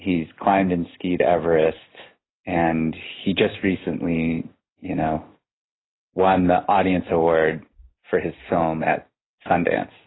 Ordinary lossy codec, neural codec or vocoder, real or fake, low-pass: AAC, 16 kbps; none; real; 7.2 kHz